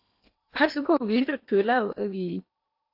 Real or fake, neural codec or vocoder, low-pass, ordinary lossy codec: fake; codec, 16 kHz in and 24 kHz out, 0.8 kbps, FocalCodec, streaming, 65536 codes; 5.4 kHz; AAC, 32 kbps